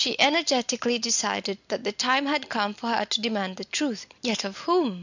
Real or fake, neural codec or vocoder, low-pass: real; none; 7.2 kHz